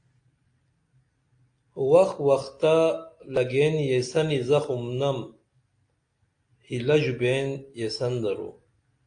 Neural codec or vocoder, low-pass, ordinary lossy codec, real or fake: none; 9.9 kHz; AAC, 48 kbps; real